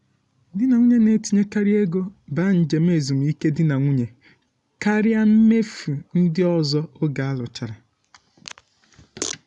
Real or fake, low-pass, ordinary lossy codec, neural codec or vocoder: real; 10.8 kHz; none; none